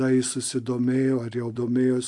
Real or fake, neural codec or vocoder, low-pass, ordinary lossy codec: real; none; 10.8 kHz; AAC, 64 kbps